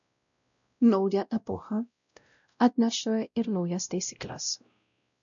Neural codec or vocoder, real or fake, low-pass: codec, 16 kHz, 0.5 kbps, X-Codec, WavLM features, trained on Multilingual LibriSpeech; fake; 7.2 kHz